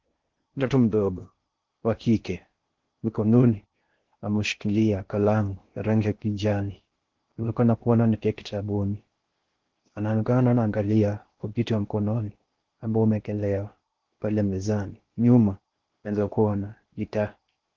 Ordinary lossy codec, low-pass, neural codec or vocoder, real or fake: Opus, 16 kbps; 7.2 kHz; codec, 16 kHz in and 24 kHz out, 0.6 kbps, FocalCodec, streaming, 4096 codes; fake